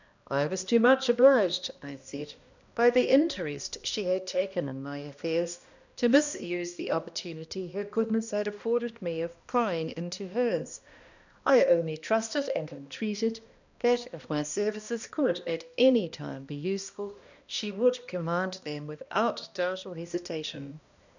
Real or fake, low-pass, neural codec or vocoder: fake; 7.2 kHz; codec, 16 kHz, 1 kbps, X-Codec, HuBERT features, trained on balanced general audio